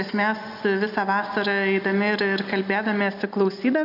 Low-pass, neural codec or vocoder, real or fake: 5.4 kHz; none; real